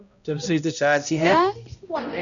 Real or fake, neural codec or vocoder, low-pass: fake; codec, 16 kHz, 0.5 kbps, X-Codec, HuBERT features, trained on balanced general audio; 7.2 kHz